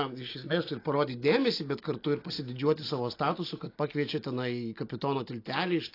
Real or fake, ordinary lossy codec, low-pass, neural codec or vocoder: real; AAC, 32 kbps; 5.4 kHz; none